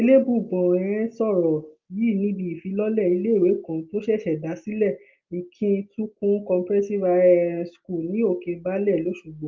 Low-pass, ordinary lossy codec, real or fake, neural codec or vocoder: 7.2 kHz; Opus, 32 kbps; real; none